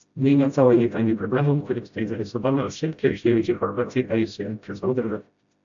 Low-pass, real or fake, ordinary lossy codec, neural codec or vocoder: 7.2 kHz; fake; AAC, 64 kbps; codec, 16 kHz, 0.5 kbps, FreqCodec, smaller model